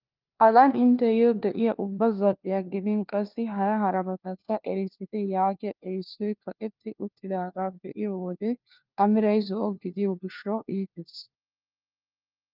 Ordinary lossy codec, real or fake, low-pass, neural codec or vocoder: Opus, 32 kbps; fake; 5.4 kHz; codec, 16 kHz, 1 kbps, FunCodec, trained on LibriTTS, 50 frames a second